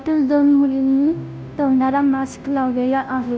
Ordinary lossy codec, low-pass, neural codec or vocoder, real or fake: none; none; codec, 16 kHz, 0.5 kbps, FunCodec, trained on Chinese and English, 25 frames a second; fake